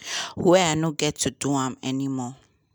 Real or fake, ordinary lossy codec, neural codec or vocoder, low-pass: real; none; none; none